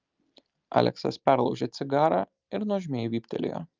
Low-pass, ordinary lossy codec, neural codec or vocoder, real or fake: 7.2 kHz; Opus, 24 kbps; none; real